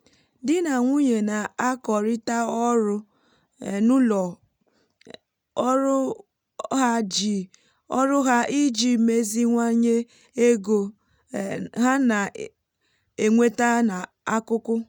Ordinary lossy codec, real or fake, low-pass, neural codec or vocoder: none; real; 19.8 kHz; none